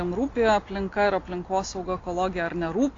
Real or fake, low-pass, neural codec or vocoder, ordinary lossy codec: real; 7.2 kHz; none; AAC, 32 kbps